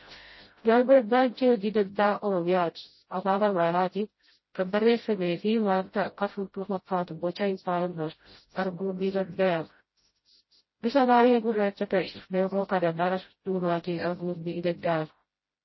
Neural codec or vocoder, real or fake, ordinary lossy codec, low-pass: codec, 16 kHz, 0.5 kbps, FreqCodec, smaller model; fake; MP3, 24 kbps; 7.2 kHz